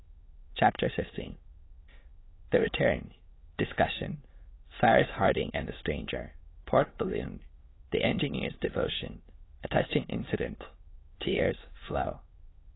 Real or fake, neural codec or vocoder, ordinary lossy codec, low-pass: fake; autoencoder, 22.05 kHz, a latent of 192 numbers a frame, VITS, trained on many speakers; AAC, 16 kbps; 7.2 kHz